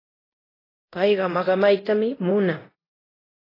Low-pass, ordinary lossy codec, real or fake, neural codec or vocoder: 5.4 kHz; AAC, 24 kbps; fake; codec, 24 kHz, 0.9 kbps, DualCodec